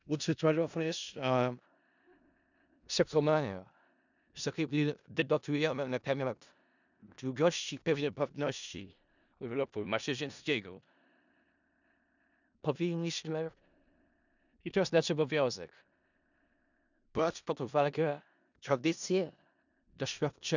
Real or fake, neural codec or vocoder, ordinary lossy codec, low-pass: fake; codec, 16 kHz in and 24 kHz out, 0.4 kbps, LongCat-Audio-Codec, four codebook decoder; none; 7.2 kHz